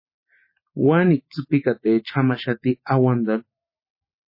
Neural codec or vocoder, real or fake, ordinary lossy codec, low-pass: none; real; MP3, 24 kbps; 5.4 kHz